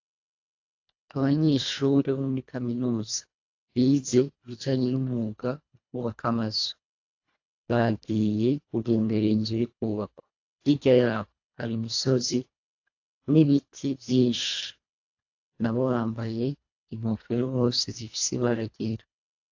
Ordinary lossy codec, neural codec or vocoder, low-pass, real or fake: AAC, 48 kbps; codec, 24 kHz, 1.5 kbps, HILCodec; 7.2 kHz; fake